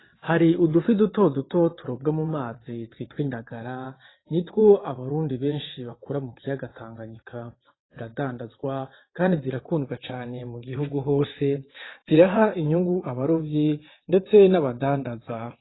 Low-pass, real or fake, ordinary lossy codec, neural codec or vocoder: 7.2 kHz; fake; AAC, 16 kbps; vocoder, 22.05 kHz, 80 mel bands, WaveNeXt